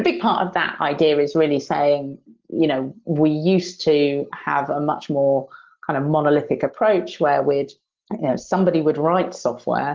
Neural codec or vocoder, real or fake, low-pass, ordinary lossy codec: none; real; 7.2 kHz; Opus, 16 kbps